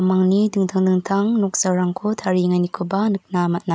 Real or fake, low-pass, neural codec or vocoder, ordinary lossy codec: real; none; none; none